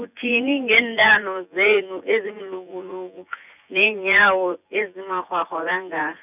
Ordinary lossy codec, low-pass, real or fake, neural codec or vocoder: none; 3.6 kHz; fake; vocoder, 24 kHz, 100 mel bands, Vocos